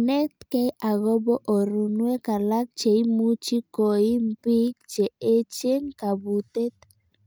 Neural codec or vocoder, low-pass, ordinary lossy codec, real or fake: none; none; none; real